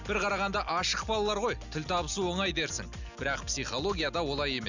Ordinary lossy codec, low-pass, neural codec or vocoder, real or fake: none; 7.2 kHz; none; real